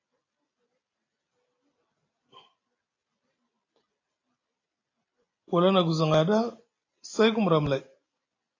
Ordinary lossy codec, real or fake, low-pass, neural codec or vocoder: AAC, 32 kbps; real; 7.2 kHz; none